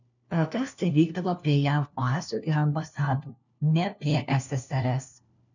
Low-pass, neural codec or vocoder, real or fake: 7.2 kHz; codec, 16 kHz, 1 kbps, FunCodec, trained on LibriTTS, 50 frames a second; fake